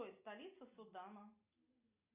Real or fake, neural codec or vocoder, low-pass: real; none; 3.6 kHz